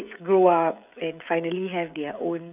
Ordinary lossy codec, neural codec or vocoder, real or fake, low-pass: none; codec, 16 kHz, 16 kbps, FreqCodec, smaller model; fake; 3.6 kHz